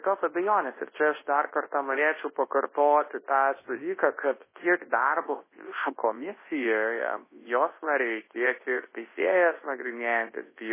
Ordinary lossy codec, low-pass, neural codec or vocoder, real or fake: MP3, 16 kbps; 3.6 kHz; codec, 24 kHz, 0.9 kbps, WavTokenizer, large speech release; fake